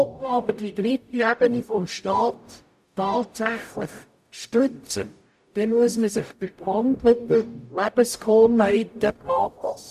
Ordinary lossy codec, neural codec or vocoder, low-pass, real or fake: none; codec, 44.1 kHz, 0.9 kbps, DAC; 14.4 kHz; fake